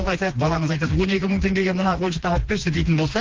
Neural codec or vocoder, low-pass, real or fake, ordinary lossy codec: codec, 16 kHz, 2 kbps, FreqCodec, smaller model; 7.2 kHz; fake; Opus, 16 kbps